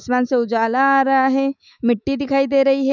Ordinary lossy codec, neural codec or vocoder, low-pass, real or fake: none; autoencoder, 48 kHz, 128 numbers a frame, DAC-VAE, trained on Japanese speech; 7.2 kHz; fake